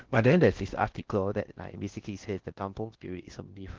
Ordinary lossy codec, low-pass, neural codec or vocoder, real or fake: Opus, 16 kbps; 7.2 kHz; codec, 16 kHz in and 24 kHz out, 0.6 kbps, FocalCodec, streaming, 4096 codes; fake